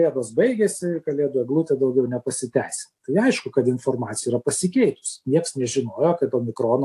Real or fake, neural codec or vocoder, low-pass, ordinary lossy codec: real; none; 14.4 kHz; AAC, 64 kbps